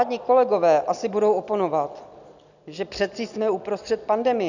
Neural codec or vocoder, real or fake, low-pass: none; real; 7.2 kHz